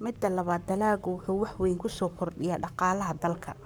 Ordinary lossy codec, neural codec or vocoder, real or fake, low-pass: none; codec, 44.1 kHz, 7.8 kbps, Pupu-Codec; fake; none